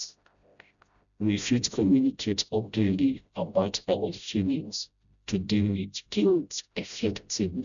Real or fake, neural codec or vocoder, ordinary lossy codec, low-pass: fake; codec, 16 kHz, 0.5 kbps, FreqCodec, smaller model; none; 7.2 kHz